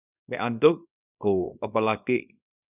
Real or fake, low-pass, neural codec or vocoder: fake; 3.6 kHz; codec, 24 kHz, 0.9 kbps, WavTokenizer, small release